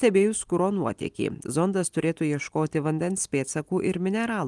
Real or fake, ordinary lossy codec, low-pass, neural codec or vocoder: real; Opus, 24 kbps; 10.8 kHz; none